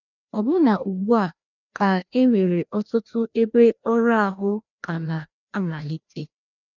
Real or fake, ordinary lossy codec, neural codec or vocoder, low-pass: fake; none; codec, 16 kHz, 1 kbps, FreqCodec, larger model; 7.2 kHz